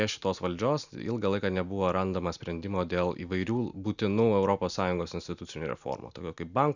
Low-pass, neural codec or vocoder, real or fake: 7.2 kHz; none; real